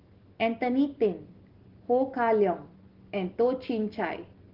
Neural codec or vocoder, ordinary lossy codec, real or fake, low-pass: none; Opus, 16 kbps; real; 5.4 kHz